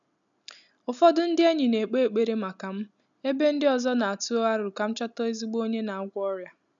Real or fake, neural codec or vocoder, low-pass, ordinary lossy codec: real; none; 7.2 kHz; MP3, 96 kbps